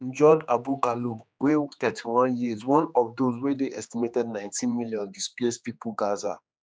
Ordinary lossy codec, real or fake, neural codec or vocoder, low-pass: none; fake; codec, 16 kHz, 2 kbps, X-Codec, HuBERT features, trained on general audio; none